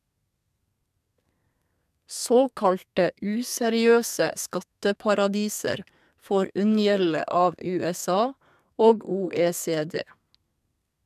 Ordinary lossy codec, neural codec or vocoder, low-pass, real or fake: none; codec, 32 kHz, 1.9 kbps, SNAC; 14.4 kHz; fake